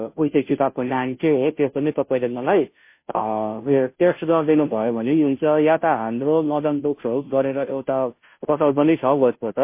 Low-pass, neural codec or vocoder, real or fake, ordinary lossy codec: 3.6 kHz; codec, 16 kHz, 0.5 kbps, FunCodec, trained on Chinese and English, 25 frames a second; fake; MP3, 24 kbps